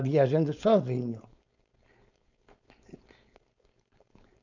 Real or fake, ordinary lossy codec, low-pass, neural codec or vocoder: fake; none; 7.2 kHz; codec, 16 kHz, 4.8 kbps, FACodec